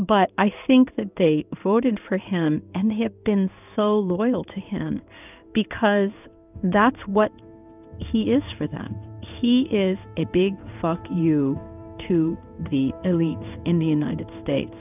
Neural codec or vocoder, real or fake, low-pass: codec, 16 kHz in and 24 kHz out, 1 kbps, XY-Tokenizer; fake; 3.6 kHz